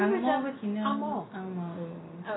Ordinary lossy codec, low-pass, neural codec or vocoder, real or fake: AAC, 16 kbps; 7.2 kHz; none; real